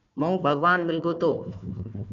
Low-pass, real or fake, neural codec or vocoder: 7.2 kHz; fake; codec, 16 kHz, 1 kbps, FunCodec, trained on Chinese and English, 50 frames a second